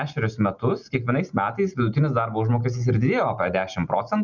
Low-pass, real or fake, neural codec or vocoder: 7.2 kHz; real; none